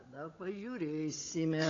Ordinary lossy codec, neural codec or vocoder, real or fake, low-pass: AAC, 32 kbps; codec, 16 kHz, 16 kbps, FunCodec, trained on Chinese and English, 50 frames a second; fake; 7.2 kHz